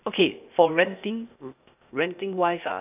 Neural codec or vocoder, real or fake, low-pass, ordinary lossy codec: codec, 16 kHz, 0.8 kbps, ZipCodec; fake; 3.6 kHz; none